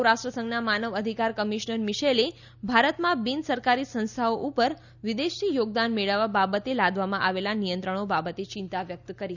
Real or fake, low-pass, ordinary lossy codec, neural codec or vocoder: real; 7.2 kHz; none; none